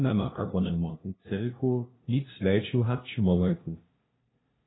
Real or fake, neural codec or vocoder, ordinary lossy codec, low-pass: fake; codec, 16 kHz, 0.5 kbps, FunCodec, trained on LibriTTS, 25 frames a second; AAC, 16 kbps; 7.2 kHz